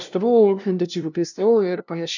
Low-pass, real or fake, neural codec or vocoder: 7.2 kHz; fake; codec, 16 kHz, 0.5 kbps, FunCodec, trained on LibriTTS, 25 frames a second